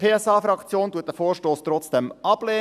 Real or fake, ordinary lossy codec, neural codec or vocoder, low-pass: real; none; none; 14.4 kHz